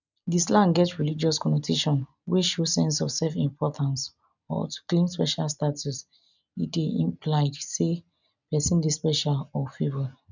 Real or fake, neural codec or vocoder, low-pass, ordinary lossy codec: real; none; 7.2 kHz; none